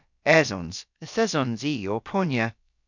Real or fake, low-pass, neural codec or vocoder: fake; 7.2 kHz; codec, 16 kHz, about 1 kbps, DyCAST, with the encoder's durations